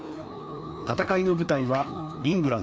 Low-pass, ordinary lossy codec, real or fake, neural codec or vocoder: none; none; fake; codec, 16 kHz, 2 kbps, FreqCodec, larger model